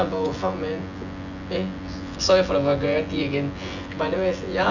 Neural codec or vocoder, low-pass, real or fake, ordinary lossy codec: vocoder, 24 kHz, 100 mel bands, Vocos; 7.2 kHz; fake; none